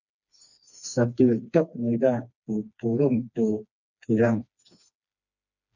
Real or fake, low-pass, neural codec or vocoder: fake; 7.2 kHz; codec, 16 kHz, 2 kbps, FreqCodec, smaller model